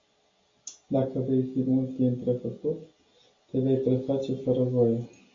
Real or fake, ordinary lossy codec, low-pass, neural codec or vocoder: real; AAC, 32 kbps; 7.2 kHz; none